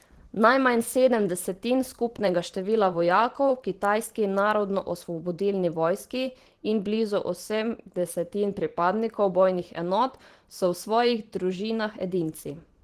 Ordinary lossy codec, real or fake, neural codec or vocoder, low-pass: Opus, 16 kbps; fake; vocoder, 44.1 kHz, 128 mel bands every 512 samples, BigVGAN v2; 14.4 kHz